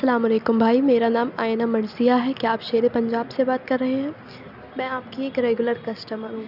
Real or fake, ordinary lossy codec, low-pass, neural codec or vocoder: real; none; 5.4 kHz; none